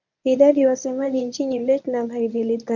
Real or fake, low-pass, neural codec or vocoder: fake; 7.2 kHz; codec, 24 kHz, 0.9 kbps, WavTokenizer, medium speech release version 1